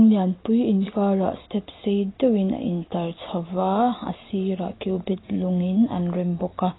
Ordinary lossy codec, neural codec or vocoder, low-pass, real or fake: AAC, 16 kbps; none; 7.2 kHz; real